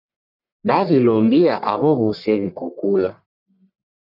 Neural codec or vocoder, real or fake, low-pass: codec, 44.1 kHz, 1.7 kbps, Pupu-Codec; fake; 5.4 kHz